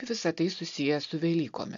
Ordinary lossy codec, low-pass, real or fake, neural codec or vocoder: AAC, 64 kbps; 7.2 kHz; real; none